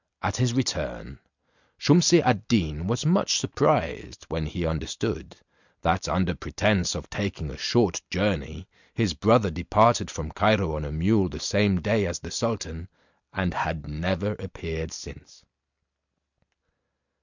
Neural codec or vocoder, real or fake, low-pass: none; real; 7.2 kHz